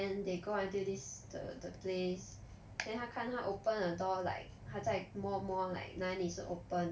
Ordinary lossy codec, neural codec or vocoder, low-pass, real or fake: none; none; none; real